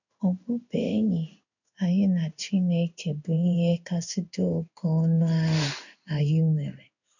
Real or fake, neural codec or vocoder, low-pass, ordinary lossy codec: fake; codec, 16 kHz in and 24 kHz out, 1 kbps, XY-Tokenizer; 7.2 kHz; none